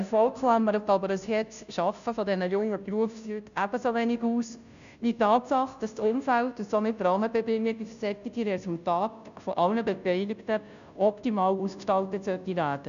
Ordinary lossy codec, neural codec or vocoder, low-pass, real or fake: none; codec, 16 kHz, 0.5 kbps, FunCodec, trained on Chinese and English, 25 frames a second; 7.2 kHz; fake